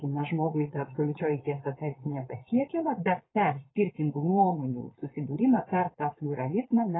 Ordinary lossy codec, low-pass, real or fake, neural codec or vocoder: AAC, 16 kbps; 7.2 kHz; fake; vocoder, 22.05 kHz, 80 mel bands, Vocos